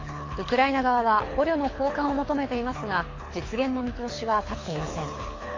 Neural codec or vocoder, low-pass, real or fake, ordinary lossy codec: codec, 24 kHz, 6 kbps, HILCodec; 7.2 kHz; fake; AAC, 32 kbps